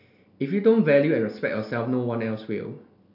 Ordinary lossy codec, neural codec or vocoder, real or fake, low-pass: none; none; real; 5.4 kHz